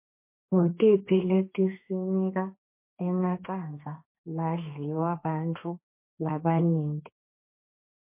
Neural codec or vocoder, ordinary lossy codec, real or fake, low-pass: codec, 32 kHz, 1.9 kbps, SNAC; MP3, 24 kbps; fake; 3.6 kHz